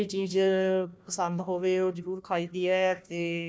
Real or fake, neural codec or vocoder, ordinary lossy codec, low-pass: fake; codec, 16 kHz, 1 kbps, FunCodec, trained on Chinese and English, 50 frames a second; none; none